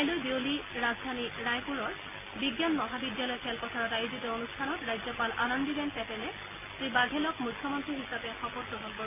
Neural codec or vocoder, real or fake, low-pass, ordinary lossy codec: none; real; 3.6 kHz; MP3, 24 kbps